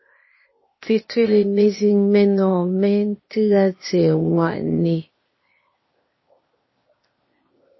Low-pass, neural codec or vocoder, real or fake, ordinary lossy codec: 7.2 kHz; codec, 16 kHz, 0.8 kbps, ZipCodec; fake; MP3, 24 kbps